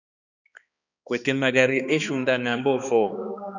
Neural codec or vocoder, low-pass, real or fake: codec, 16 kHz, 2 kbps, X-Codec, HuBERT features, trained on balanced general audio; 7.2 kHz; fake